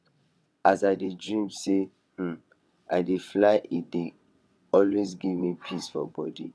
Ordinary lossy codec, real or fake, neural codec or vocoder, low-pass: none; fake; vocoder, 22.05 kHz, 80 mel bands, WaveNeXt; none